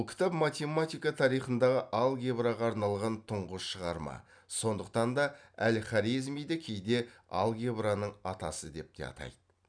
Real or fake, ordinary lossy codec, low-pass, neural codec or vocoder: real; none; 9.9 kHz; none